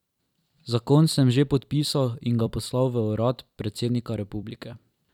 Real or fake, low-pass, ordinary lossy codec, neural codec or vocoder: fake; 19.8 kHz; none; vocoder, 44.1 kHz, 128 mel bands every 256 samples, BigVGAN v2